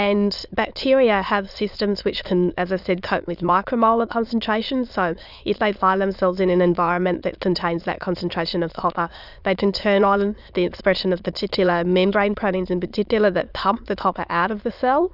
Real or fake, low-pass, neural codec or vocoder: fake; 5.4 kHz; autoencoder, 22.05 kHz, a latent of 192 numbers a frame, VITS, trained on many speakers